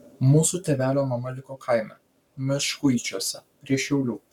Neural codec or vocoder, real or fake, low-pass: codec, 44.1 kHz, 7.8 kbps, Pupu-Codec; fake; 19.8 kHz